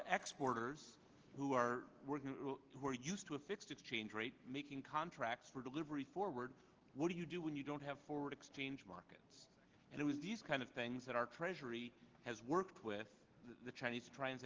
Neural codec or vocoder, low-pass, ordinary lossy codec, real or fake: none; 7.2 kHz; Opus, 16 kbps; real